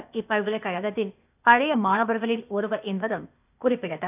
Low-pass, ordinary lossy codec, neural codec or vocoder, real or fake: 3.6 kHz; none; codec, 16 kHz, 0.8 kbps, ZipCodec; fake